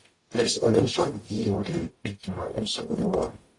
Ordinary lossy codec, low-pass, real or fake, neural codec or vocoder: AAC, 32 kbps; 10.8 kHz; fake; codec, 44.1 kHz, 0.9 kbps, DAC